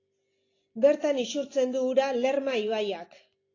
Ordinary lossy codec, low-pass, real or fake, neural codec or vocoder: AAC, 32 kbps; 7.2 kHz; real; none